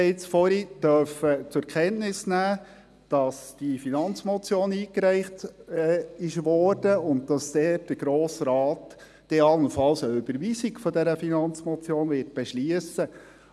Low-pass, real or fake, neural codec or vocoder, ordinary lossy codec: none; real; none; none